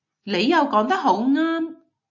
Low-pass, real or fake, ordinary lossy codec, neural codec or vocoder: 7.2 kHz; real; AAC, 48 kbps; none